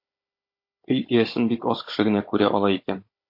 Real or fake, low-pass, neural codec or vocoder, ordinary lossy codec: fake; 5.4 kHz; codec, 16 kHz, 16 kbps, FunCodec, trained on Chinese and English, 50 frames a second; MP3, 32 kbps